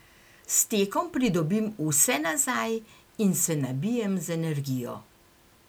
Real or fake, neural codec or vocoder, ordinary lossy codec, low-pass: real; none; none; none